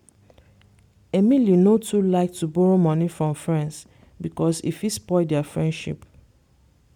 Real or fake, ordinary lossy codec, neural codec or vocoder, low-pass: real; MP3, 96 kbps; none; 19.8 kHz